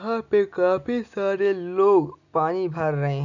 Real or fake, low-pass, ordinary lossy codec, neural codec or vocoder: real; 7.2 kHz; none; none